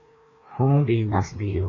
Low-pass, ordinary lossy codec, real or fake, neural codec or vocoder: 7.2 kHz; AAC, 32 kbps; fake; codec, 16 kHz, 2 kbps, FreqCodec, larger model